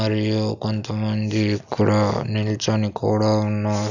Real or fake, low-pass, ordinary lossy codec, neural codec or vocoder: real; 7.2 kHz; none; none